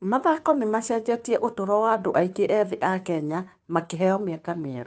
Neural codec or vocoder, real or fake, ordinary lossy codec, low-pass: codec, 16 kHz, 2 kbps, FunCodec, trained on Chinese and English, 25 frames a second; fake; none; none